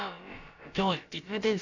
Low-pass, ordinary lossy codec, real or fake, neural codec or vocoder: 7.2 kHz; none; fake; codec, 16 kHz, about 1 kbps, DyCAST, with the encoder's durations